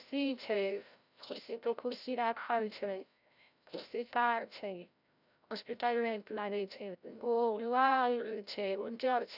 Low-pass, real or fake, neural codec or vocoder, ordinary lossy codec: 5.4 kHz; fake; codec, 16 kHz, 0.5 kbps, FreqCodec, larger model; none